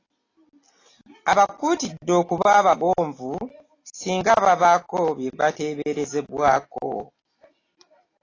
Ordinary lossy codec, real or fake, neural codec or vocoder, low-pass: AAC, 32 kbps; real; none; 7.2 kHz